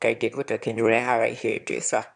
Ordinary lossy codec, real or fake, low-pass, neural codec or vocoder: none; fake; 9.9 kHz; autoencoder, 22.05 kHz, a latent of 192 numbers a frame, VITS, trained on one speaker